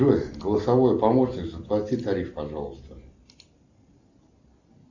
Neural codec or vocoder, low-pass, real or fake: none; 7.2 kHz; real